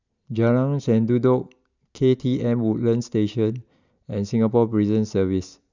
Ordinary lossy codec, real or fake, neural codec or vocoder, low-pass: none; real; none; 7.2 kHz